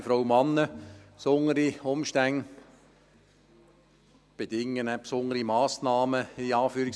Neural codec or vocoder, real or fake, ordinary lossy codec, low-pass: none; real; none; none